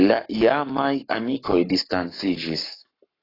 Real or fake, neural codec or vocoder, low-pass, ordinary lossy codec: fake; codec, 44.1 kHz, 7.8 kbps, Pupu-Codec; 5.4 kHz; AAC, 24 kbps